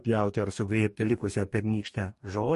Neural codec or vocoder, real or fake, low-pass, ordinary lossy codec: codec, 44.1 kHz, 2.6 kbps, DAC; fake; 14.4 kHz; MP3, 48 kbps